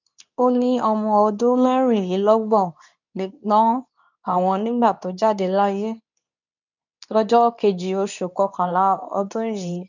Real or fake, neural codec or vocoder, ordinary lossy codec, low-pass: fake; codec, 24 kHz, 0.9 kbps, WavTokenizer, medium speech release version 2; none; 7.2 kHz